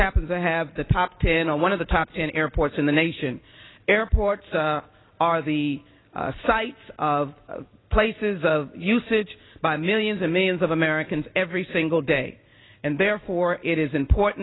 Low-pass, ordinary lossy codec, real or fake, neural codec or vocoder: 7.2 kHz; AAC, 16 kbps; real; none